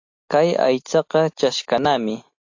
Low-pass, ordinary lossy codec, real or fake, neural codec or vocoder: 7.2 kHz; AAC, 48 kbps; real; none